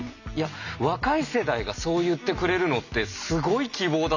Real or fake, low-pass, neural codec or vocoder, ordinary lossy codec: real; 7.2 kHz; none; none